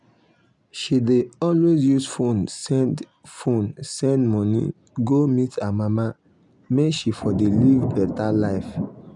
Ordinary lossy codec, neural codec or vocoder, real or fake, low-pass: none; none; real; 10.8 kHz